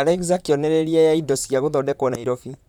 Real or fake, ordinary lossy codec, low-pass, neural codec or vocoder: fake; none; 19.8 kHz; vocoder, 44.1 kHz, 128 mel bands, Pupu-Vocoder